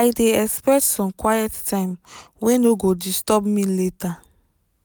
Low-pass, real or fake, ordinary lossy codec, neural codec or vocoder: none; real; none; none